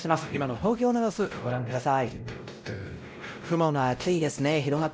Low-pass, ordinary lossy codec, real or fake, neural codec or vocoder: none; none; fake; codec, 16 kHz, 0.5 kbps, X-Codec, WavLM features, trained on Multilingual LibriSpeech